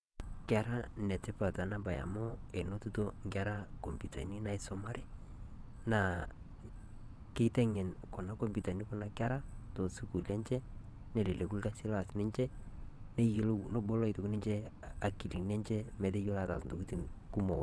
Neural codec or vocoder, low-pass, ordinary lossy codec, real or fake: vocoder, 22.05 kHz, 80 mel bands, WaveNeXt; none; none; fake